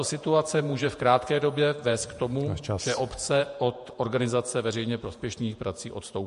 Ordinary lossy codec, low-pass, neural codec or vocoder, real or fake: MP3, 48 kbps; 14.4 kHz; vocoder, 48 kHz, 128 mel bands, Vocos; fake